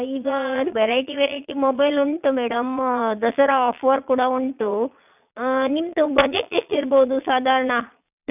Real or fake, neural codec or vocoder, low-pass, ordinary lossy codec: fake; vocoder, 22.05 kHz, 80 mel bands, Vocos; 3.6 kHz; none